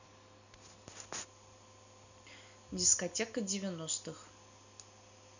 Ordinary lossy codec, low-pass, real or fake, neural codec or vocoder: none; 7.2 kHz; real; none